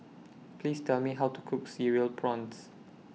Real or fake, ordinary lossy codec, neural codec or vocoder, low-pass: real; none; none; none